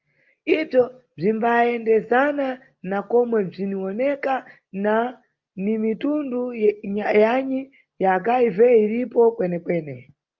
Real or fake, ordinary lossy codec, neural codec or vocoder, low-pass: real; Opus, 32 kbps; none; 7.2 kHz